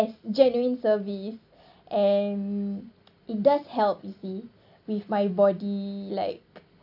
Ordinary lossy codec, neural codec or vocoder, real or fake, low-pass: AAC, 48 kbps; none; real; 5.4 kHz